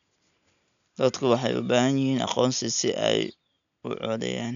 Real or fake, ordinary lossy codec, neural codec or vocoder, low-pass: real; none; none; 7.2 kHz